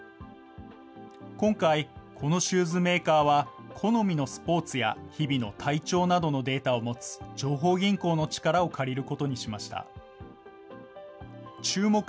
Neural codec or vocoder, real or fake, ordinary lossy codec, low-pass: none; real; none; none